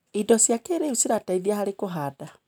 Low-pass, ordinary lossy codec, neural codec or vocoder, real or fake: none; none; none; real